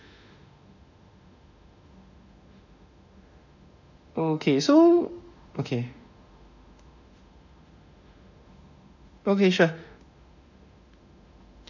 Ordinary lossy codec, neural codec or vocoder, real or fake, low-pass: none; autoencoder, 48 kHz, 32 numbers a frame, DAC-VAE, trained on Japanese speech; fake; 7.2 kHz